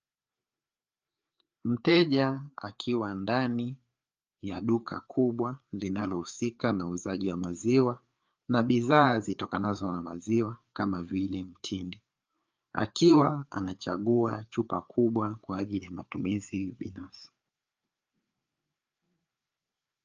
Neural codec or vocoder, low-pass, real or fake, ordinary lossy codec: codec, 16 kHz, 4 kbps, FreqCodec, larger model; 7.2 kHz; fake; Opus, 24 kbps